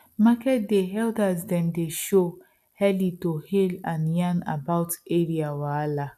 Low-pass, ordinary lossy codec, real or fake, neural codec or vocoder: 14.4 kHz; none; real; none